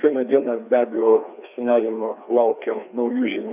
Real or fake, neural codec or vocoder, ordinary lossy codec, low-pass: fake; codec, 16 kHz, 2 kbps, FreqCodec, larger model; AAC, 32 kbps; 3.6 kHz